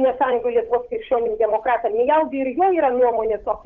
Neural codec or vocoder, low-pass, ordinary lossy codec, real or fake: codec, 16 kHz, 8 kbps, FunCodec, trained on Chinese and English, 25 frames a second; 7.2 kHz; Opus, 32 kbps; fake